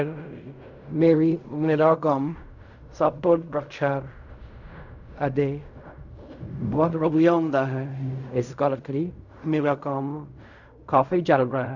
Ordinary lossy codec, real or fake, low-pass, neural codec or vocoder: none; fake; 7.2 kHz; codec, 16 kHz in and 24 kHz out, 0.4 kbps, LongCat-Audio-Codec, fine tuned four codebook decoder